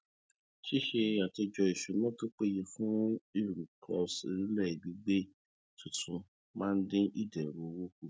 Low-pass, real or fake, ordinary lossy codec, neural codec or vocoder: none; real; none; none